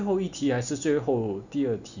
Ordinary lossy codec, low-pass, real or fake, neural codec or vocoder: none; 7.2 kHz; real; none